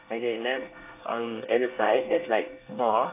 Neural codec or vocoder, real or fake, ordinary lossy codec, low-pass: codec, 24 kHz, 1 kbps, SNAC; fake; AAC, 24 kbps; 3.6 kHz